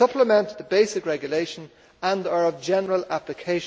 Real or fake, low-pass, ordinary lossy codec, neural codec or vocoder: real; none; none; none